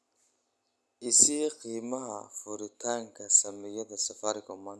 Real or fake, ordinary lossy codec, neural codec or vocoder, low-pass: real; none; none; none